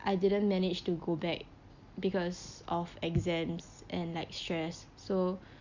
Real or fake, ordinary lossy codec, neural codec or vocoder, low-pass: real; Opus, 64 kbps; none; 7.2 kHz